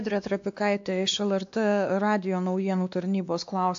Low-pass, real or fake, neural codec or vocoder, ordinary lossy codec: 7.2 kHz; fake; codec, 16 kHz, 2 kbps, X-Codec, WavLM features, trained on Multilingual LibriSpeech; AAC, 64 kbps